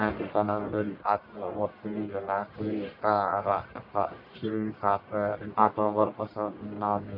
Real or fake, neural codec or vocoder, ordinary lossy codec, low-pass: fake; codec, 44.1 kHz, 1.7 kbps, Pupu-Codec; none; 5.4 kHz